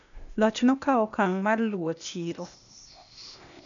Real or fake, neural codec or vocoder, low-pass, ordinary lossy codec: fake; codec, 16 kHz, 0.8 kbps, ZipCodec; 7.2 kHz; none